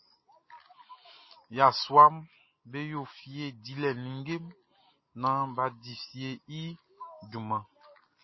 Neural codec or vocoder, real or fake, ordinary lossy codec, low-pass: none; real; MP3, 24 kbps; 7.2 kHz